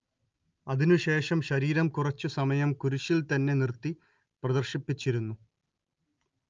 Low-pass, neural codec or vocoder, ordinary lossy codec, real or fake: 7.2 kHz; none; Opus, 24 kbps; real